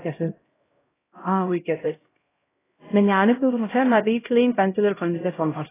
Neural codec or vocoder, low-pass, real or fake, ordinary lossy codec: codec, 16 kHz, 0.5 kbps, X-Codec, HuBERT features, trained on LibriSpeech; 3.6 kHz; fake; AAC, 16 kbps